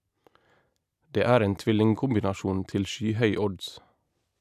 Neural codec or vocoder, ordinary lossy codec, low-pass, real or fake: none; none; 14.4 kHz; real